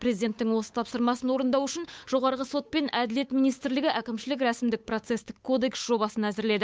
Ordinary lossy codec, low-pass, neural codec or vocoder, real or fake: none; none; codec, 16 kHz, 8 kbps, FunCodec, trained on Chinese and English, 25 frames a second; fake